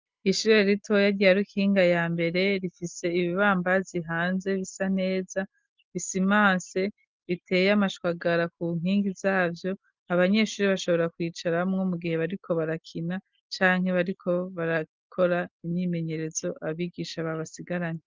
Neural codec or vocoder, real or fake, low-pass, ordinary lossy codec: none; real; 7.2 kHz; Opus, 32 kbps